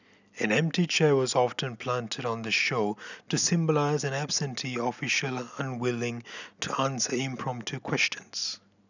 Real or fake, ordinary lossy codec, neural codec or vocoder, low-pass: real; none; none; 7.2 kHz